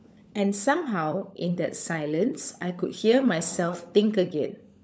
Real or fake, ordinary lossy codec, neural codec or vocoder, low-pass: fake; none; codec, 16 kHz, 16 kbps, FunCodec, trained on LibriTTS, 50 frames a second; none